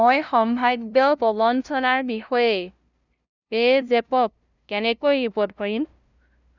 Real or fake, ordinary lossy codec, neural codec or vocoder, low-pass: fake; none; codec, 16 kHz, 0.5 kbps, FunCodec, trained on LibriTTS, 25 frames a second; 7.2 kHz